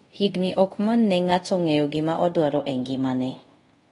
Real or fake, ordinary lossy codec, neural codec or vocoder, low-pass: fake; AAC, 32 kbps; codec, 24 kHz, 0.5 kbps, DualCodec; 10.8 kHz